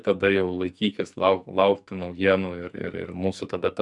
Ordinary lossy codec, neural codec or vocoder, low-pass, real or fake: MP3, 96 kbps; codec, 44.1 kHz, 2.6 kbps, SNAC; 10.8 kHz; fake